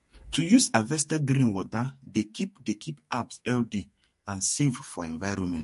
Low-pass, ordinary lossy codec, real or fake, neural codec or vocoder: 14.4 kHz; MP3, 48 kbps; fake; codec, 44.1 kHz, 2.6 kbps, SNAC